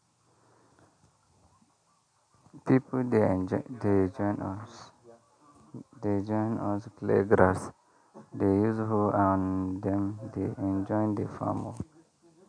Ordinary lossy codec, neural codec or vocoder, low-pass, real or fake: none; none; 9.9 kHz; real